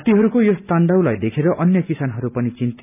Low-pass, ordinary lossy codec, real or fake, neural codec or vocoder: 3.6 kHz; none; real; none